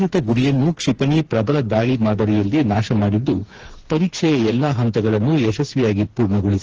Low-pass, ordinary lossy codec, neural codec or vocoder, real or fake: 7.2 kHz; Opus, 16 kbps; codec, 16 kHz, 4 kbps, FreqCodec, smaller model; fake